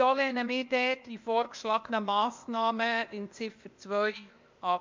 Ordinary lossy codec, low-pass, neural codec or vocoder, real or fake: MP3, 64 kbps; 7.2 kHz; codec, 16 kHz, 0.8 kbps, ZipCodec; fake